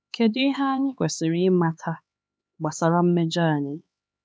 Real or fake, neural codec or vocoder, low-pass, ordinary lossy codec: fake; codec, 16 kHz, 4 kbps, X-Codec, HuBERT features, trained on LibriSpeech; none; none